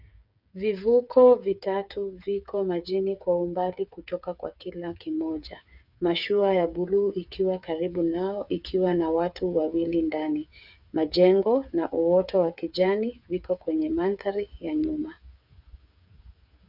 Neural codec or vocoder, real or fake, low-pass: codec, 16 kHz, 8 kbps, FreqCodec, smaller model; fake; 5.4 kHz